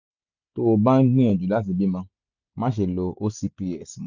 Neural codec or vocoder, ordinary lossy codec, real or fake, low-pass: none; none; real; 7.2 kHz